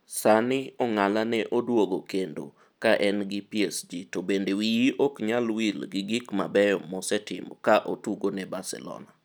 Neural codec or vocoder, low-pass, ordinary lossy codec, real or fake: none; none; none; real